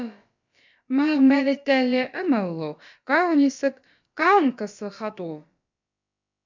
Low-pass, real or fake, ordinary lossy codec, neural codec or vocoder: 7.2 kHz; fake; MP3, 64 kbps; codec, 16 kHz, about 1 kbps, DyCAST, with the encoder's durations